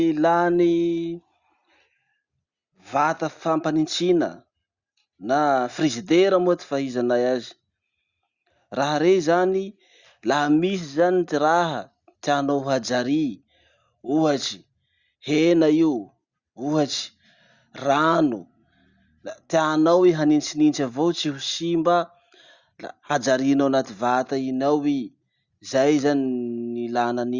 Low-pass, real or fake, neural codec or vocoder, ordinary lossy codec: 7.2 kHz; real; none; Opus, 64 kbps